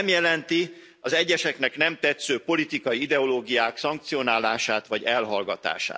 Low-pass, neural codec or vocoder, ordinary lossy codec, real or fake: none; none; none; real